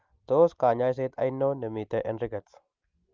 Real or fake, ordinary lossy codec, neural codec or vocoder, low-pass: real; Opus, 32 kbps; none; 7.2 kHz